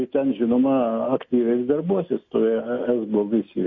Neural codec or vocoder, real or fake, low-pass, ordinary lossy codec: none; real; 7.2 kHz; MP3, 32 kbps